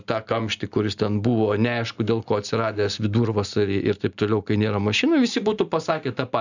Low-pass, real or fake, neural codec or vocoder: 7.2 kHz; real; none